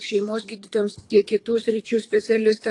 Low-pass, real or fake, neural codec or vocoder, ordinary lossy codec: 10.8 kHz; fake; codec, 24 kHz, 3 kbps, HILCodec; AAC, 48 kbps